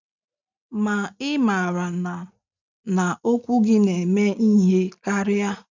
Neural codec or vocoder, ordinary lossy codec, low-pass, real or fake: none; none; 7.2 kHz; real